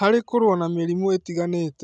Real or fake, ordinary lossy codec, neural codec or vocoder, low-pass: real; none; none; none